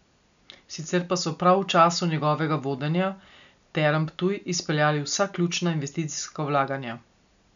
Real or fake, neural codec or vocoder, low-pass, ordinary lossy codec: real; none; 7.2 kHz; none